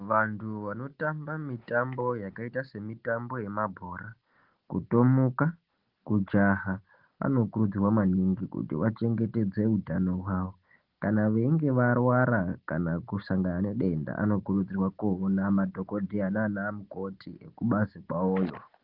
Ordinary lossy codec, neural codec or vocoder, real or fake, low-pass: Opus, 16 kbps; none; real; 5.4 kHz